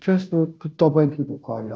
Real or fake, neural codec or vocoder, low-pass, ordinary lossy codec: fake; codec, 16 kHz, 0.5 kbps, FunCodec, trained on Chinese and English, 25 frames a second; none; none